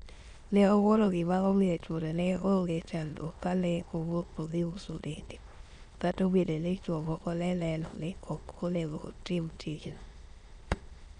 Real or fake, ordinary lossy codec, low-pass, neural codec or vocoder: fake; none; 9.9 kHz; autoencoder, 22.05 kHz, a latent of 192 numbers a frame, VITS, trained on many speakers